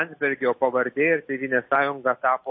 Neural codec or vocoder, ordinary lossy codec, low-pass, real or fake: none; MP3, 24 kbps; 7.2 kHz; real